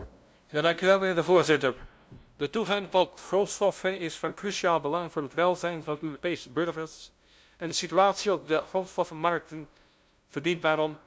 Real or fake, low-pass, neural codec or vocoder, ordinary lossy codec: fake; none; codec, 16 kHz, 0.5 kbps, FunCodec, trained on LibriTTS, 25 frames a second; none